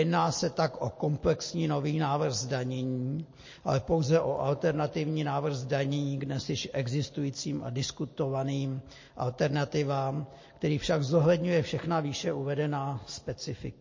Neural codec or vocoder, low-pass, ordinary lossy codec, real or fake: none; 7.2 kHz; MP3, 32 kbps; real